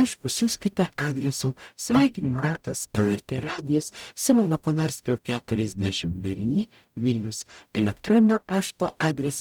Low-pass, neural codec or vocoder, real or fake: 19.8 kHz; codec, 44.1 kHz, 0.9 kbps, DAC; fake